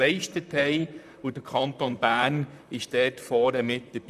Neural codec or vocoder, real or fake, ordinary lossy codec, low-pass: vocoder, 44.1 kHz, 128 mel bands, Pupu-Vocoder; fake; none; 14.4 kHz